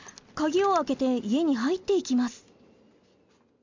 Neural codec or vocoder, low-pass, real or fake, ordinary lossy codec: none; 7.2 kHz; real; MP3, 64 kbps